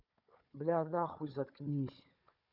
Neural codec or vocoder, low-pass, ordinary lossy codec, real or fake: codec, 16 kHz, 4 kbps, FunCodec, trained on Chinese and English, 50 frames a second; 5.4 kHz; none; fake